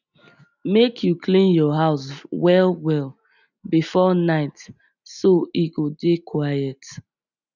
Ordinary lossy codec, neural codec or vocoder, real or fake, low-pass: none; none; real; 7.2 kHz